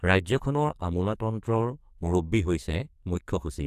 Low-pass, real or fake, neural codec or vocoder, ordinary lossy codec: 14.4 kHz; fake; codec, 44.1 kHz, 2.6 kbps, SNAC; AAC, 96 kbps